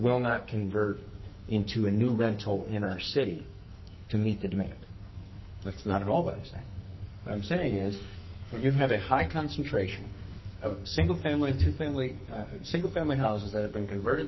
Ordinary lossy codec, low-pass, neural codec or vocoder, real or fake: MP3, 24 kbps; 7.2 kHz; codec, 44.1 kHz, 2.6 kbps, SNAC; fake